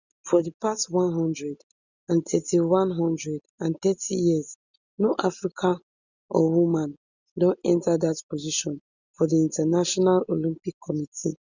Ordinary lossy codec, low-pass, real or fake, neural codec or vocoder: Opus, 64 kbps; 7.2 kHz; real; none